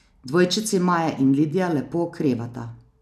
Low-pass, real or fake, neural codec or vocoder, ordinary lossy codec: 14.4 kHz; real; none; none